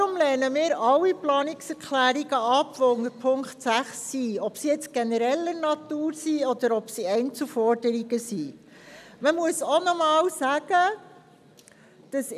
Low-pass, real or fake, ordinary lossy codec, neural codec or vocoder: 14.4 kHz; real; none; none